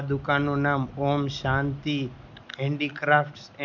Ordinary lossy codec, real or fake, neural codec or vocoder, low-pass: none; real; none; 7.2 kHz